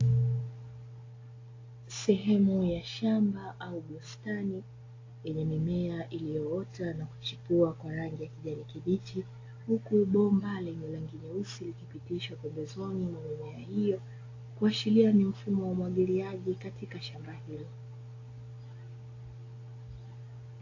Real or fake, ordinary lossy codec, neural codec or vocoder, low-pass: real; AAC, 32 kbps; none; 7.2 kHz